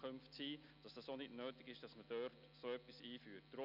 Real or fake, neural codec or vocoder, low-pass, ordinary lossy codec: real; none; 5.4 kHz; none